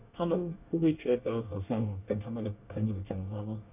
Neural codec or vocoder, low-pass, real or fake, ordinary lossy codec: codec, 24 kHz, 1 kbps, SNAC; 3.6 kHz; fake; none